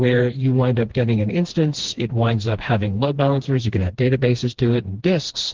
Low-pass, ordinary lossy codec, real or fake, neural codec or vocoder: 7.2 kHz; Opus, 16 kbps; fake; codec, 16 kHz, 2 kbps, FreqCodec, smaller model